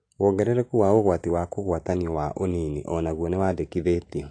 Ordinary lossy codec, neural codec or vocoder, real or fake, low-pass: AAC, 48 kbps; none; real; 9.9 kHz